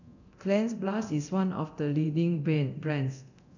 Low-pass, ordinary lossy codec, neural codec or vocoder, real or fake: 7.2 kHz; none; codec, 24 kHz, 0.9 kbps, DualCodec; fake